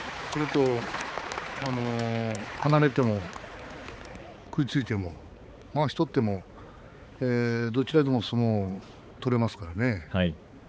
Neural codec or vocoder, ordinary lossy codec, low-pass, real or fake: codec, 16 kHz, 4 kbps, X-Codec, HuBERT features, trained on balanced general audio; none; none; fake